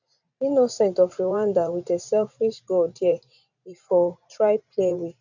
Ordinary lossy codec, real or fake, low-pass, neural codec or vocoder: none; fake; 7.2 kHz; vocoder, 44.1 kHz, 128 mel bands every 512 samples, BigVGAN v2